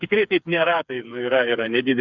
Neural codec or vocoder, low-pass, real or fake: codec, 16 kHz, 4 kbps, FreqCodec, smaller model; 7.2 kHz; fake